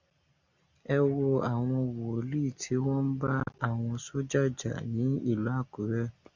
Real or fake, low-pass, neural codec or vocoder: real; 7.2 kHz; none